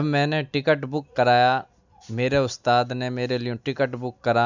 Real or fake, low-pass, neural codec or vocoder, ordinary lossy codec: real; 7.2 kHz; none; none